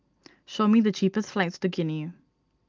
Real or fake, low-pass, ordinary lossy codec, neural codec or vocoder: real; 7.2 kHz; Opus, 24 kbps; none